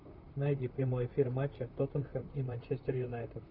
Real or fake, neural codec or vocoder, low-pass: fake; vocoder, 44.1 kHz, 128 mel bands, Pupu-Vocoder; 5.4 kHz